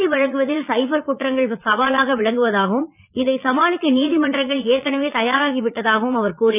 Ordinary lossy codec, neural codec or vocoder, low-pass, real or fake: none; vocoder, 22.05 kHz, 80 mel bands, Vocos; 3.6 kHz; fake